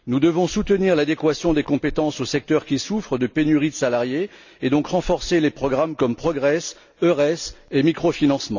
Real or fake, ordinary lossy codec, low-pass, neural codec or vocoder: real; none; 7.2 kHz; none